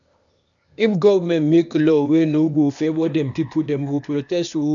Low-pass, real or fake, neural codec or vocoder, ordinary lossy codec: 7.2 kHz; fake; codec, 16 kHz, 0.8 kbps, ZipCodec; none